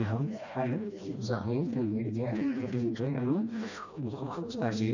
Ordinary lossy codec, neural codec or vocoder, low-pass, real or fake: none; codec, 16 kHz, 1 kbps, FreqCodec, smaller model; 7.2 kHz; fake